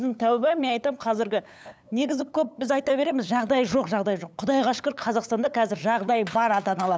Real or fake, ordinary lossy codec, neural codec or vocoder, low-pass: fake; none; codec, 16 kHz, 8 kbps, FunCodec, trained on LibriTTS, 25 frames a second; none